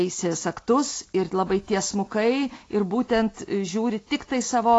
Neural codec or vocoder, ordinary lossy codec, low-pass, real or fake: none; AAC, 32 kbps; 10.8 kHz; real